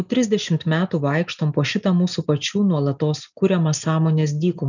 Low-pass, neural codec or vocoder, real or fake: 7.2 kHz; none; real